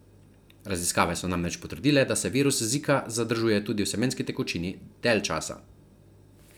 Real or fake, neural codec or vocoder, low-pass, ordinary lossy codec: real; none; none; none